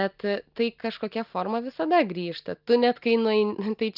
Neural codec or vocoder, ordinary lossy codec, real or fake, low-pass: none; Opus, 24 kbps; real; 5.4 kHz